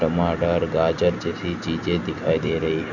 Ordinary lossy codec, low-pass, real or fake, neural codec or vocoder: none; 7.2 kHz; real; none